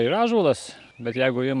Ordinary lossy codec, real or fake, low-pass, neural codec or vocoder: MP3, 96 kbps; real; 10.8 kHz; none